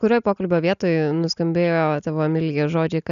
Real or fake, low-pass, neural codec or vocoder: real; 7.2 kHz; none